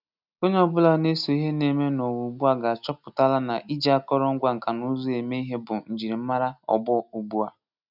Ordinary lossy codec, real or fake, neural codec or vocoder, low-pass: none; real; none; 5.4 kHz